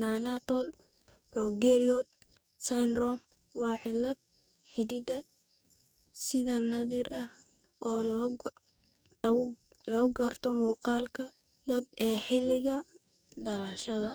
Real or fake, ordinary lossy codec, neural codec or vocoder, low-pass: fake; none; codec, 44.1 kHz, 2.6 kbps, DAC; none